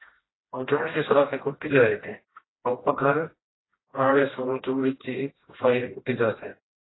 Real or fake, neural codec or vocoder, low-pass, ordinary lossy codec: fake; codec, 16 kHz, 1 kbps, FreqCodec, smaller model; 7.2 kHz; AAC, 16 kbps